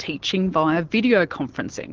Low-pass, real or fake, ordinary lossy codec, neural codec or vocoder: 7.2 kHz; real; Opus, 16 kbps; none